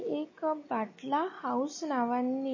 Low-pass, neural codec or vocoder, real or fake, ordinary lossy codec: 7.2 kHz; none; real; MP3, 32 kbps